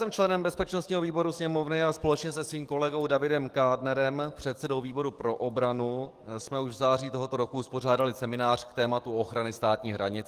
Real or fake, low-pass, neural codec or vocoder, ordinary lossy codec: fake; 14.4 kHz; codec, 44.1 kHz, 7.8 kbps, DAC; Opus, 24 kbps